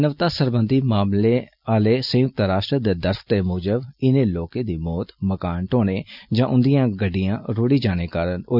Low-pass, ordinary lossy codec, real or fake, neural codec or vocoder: 5.4 kHz; none; real; none